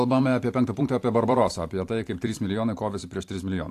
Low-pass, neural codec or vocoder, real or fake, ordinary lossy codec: 14.4 kHz; vocoder, 44.1 kHz, 128 mel bands every 256 samples, BigVGAN v2; fake; AAC, 64 kbps